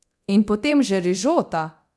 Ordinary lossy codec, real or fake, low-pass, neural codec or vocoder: none; fake; none; codec, 24 kHz, 0.9 kbps, DualCodec